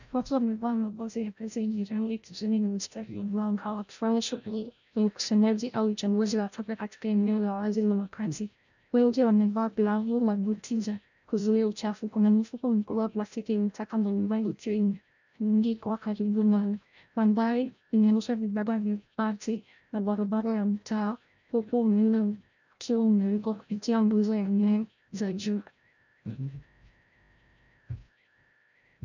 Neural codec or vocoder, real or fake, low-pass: codec, 16 kHz, 0.5 kbps, FreqCodec, larger model; fake; 7.2 kHz